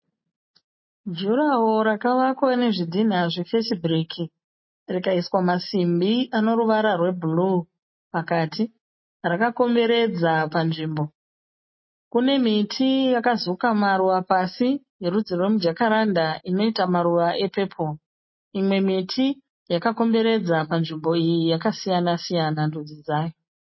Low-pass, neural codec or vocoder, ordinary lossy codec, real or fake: 7.2 kHz; none; MP3, 24 kbps; real